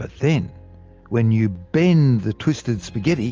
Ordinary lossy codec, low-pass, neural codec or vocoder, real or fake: Opus, 24 kbps; 7.2 kHz; none; real